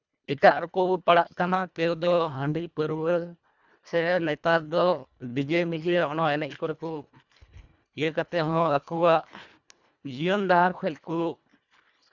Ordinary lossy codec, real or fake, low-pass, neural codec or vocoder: none; fake; 7.2 kHz; codec, 24 kHz, 1.5 kbps, HILCodec